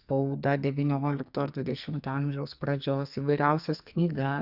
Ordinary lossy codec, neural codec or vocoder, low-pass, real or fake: Opus, 64 kbps; codec, 44.1 kHz, 2.6 kbps, SNAC; 5.4 kHz; fake